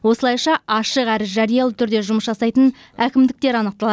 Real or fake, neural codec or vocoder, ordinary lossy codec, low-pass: real; none; none; none